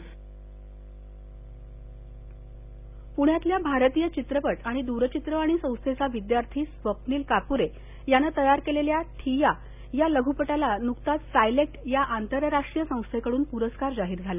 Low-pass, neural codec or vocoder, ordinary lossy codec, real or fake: 3.6 kHz; none; none; real